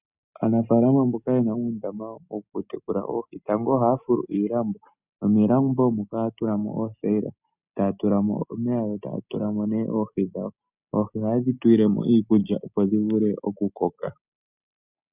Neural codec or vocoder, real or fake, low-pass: none; real; 3.6 kHz